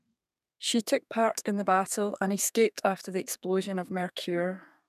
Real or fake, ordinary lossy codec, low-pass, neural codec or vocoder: fake; none; 14.4 kHz; codec, 32 kHz, 1.9 kbps, SNAC